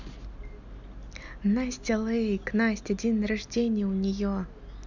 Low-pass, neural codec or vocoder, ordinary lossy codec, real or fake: 7.2 kHz; none; none; real